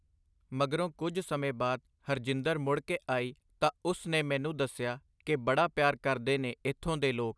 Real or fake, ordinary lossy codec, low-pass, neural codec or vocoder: real; none; none; none